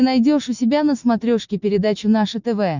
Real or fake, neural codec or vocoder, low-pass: real; none; 7.2 kHz